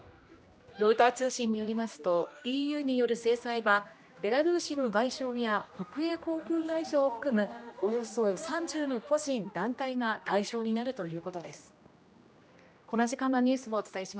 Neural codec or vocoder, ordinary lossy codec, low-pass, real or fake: codec, 16 kHz, 1 kbps, X-Codec, HuBERT features, trained on general audio; none; none; fake